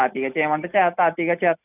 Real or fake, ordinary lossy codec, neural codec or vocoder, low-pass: real; none; none; 3.6 kHz